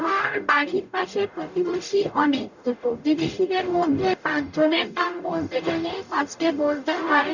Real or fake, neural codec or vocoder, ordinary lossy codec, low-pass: fake; codec, 44.1 kHz, 0.9 kbps, DAC; none; 7.2 kHz